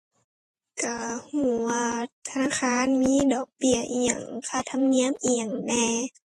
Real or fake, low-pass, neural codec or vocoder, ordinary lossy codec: fake; 19.8 kHz; vocoder, 48 kHz, 128 mel bands, Vocos; AAC, 32 kbps